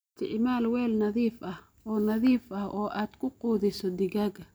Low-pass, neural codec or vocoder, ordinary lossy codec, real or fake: none; none; none; real